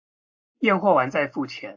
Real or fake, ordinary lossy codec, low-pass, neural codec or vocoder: real; AAC, 48 kbps; 7.2 kHz; none